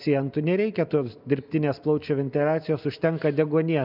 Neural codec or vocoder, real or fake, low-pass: none; real; 5.4 kHz